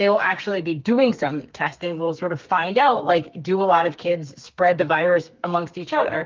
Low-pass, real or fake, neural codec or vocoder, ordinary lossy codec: 7.2 kHz; fake; codec, 32 kHz, 1.9 kbps, SNAC; Opus, 24 kbps